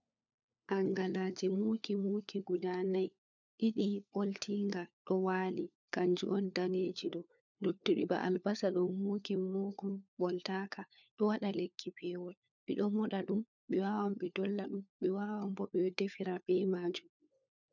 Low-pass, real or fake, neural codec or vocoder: 7.2 kHz; fake; codec, 16 kHz, 4 kbps, FunCodec, trained on LibriTTS, 50 frames a second